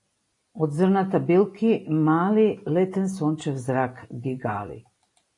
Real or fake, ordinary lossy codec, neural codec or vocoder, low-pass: real; AAC, 48 kbps; none; 10.8 kHz